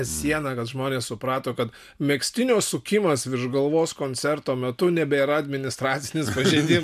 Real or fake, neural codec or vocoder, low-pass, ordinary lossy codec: real; none; 14.4 kHz; MP3, 96 kbps